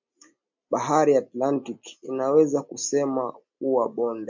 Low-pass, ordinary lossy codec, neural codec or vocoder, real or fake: 7.2 kHz; MP3, 64 kbps; none; real